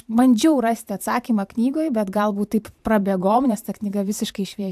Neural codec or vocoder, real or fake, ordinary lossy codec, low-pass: vocoder, 44.1 kHz, 128 mel bands every 512 samples, BigVGAN v2; fake; AAC, 96 kbps; 14.4 kHz